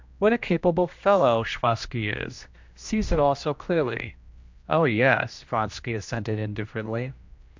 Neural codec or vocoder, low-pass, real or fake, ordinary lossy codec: codec, 16 kHz, 1 kbps, X-Codec, HuBERT features, trained on general audio; 7.2 kHz; fake; MP3, 64 kbps